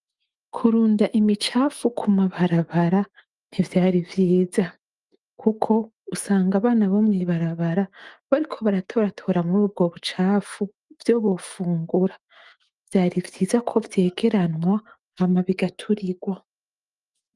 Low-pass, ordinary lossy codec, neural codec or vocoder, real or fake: 10.8 kHz; Opus, 32 kbps; autoencoder, 48 kHz, 128 numbers a frame, DAC-VAE, trained on Japanese speech; fake